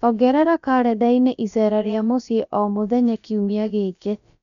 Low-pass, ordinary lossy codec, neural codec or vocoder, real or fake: 7.2 kHz; none; codec, 16 kHz, about 1 kbps, DyCAST, with the encoder's durations; fake